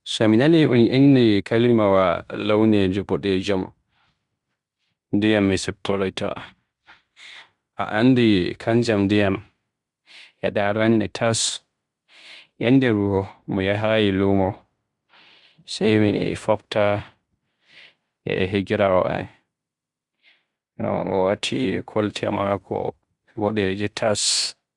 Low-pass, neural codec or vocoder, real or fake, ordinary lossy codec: 10.8 kHz; codec, 16 kHz in and 24 kHz out, 0.9 kbps, LongCat-Audio-Codec, fine tuned four codebook decoder; fake; Opus, 64 kbps